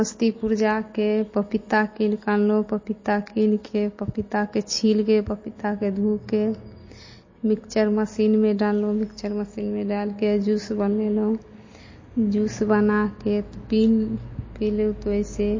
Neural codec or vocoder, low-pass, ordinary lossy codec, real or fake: none; 7.2 kHz; MP3, 32 kbps; real